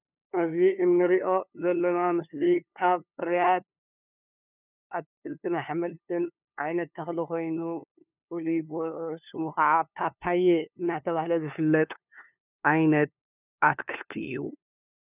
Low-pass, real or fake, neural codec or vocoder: 3.6 kHz; fake; codec, 16 kHz, 2 kbps, FunCodec, trained on LibriTTS, 25 frames a second